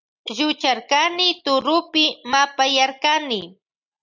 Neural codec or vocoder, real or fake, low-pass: none; real; 7.2 kHz